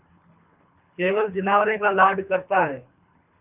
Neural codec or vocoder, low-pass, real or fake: codec, 24 kHz, 3 kbps, HILCodec; 3.6 kHz; fake